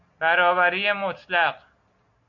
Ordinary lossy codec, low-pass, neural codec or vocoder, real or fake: MP3, 48 kbps; 7.2 kHz; none; real